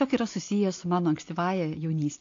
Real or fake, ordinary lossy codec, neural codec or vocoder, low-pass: real; AAC, 48 kbps; none; 7.2 kHz